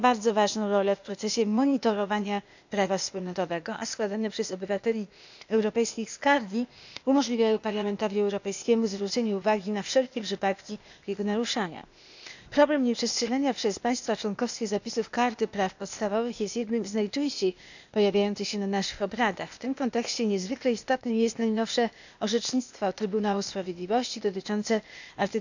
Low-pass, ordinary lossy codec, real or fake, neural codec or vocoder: 7.2 kHz; none; fake; codec, 16 kHz, 0.8 kbps, ZipCodec